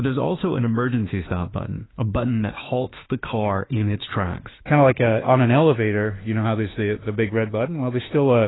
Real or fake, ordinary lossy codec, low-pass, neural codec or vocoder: fake; AAC, 16 kbps; 7.2 kHz; autoencoder, 48 kHz, 32 numbers a frame, DAC-VAE, trained on Japanese speech